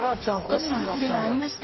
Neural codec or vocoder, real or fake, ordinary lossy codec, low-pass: codec, 44.1 kHz, 2.6 kbps, DAC; fake; MP3, 24 kbps; 7.2 kHz